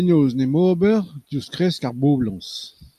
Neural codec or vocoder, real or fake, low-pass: none; real; 14.4 kHz